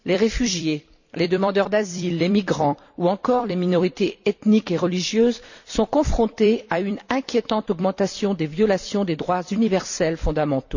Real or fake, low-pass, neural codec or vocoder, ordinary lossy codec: fake; 7.2 kHz; vocoder, 44.1 kHz, 128 mel bands every 256 samples, BigVGAN v2; none